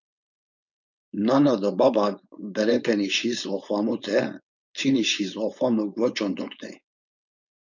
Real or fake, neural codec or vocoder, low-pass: fake; codec, 16 kHz, 4.8 kbps, FACodec; 7.2 kHz